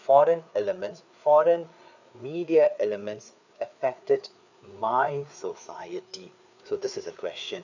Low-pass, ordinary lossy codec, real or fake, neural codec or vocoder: 7.2 kHz; none; fake; codec, 16 kHz, 4 kbps, FreqCodec, larger model